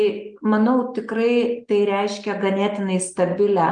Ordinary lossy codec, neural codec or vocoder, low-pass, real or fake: Opus, 32 kbps; none; 9.9 kHz; real